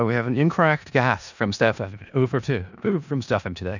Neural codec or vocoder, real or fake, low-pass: codec, 16 kHz in and 24 kHz out, 0.4 kbps, LongCat-Audio-Codec, four codebook decoder; fake; 7.2 kHz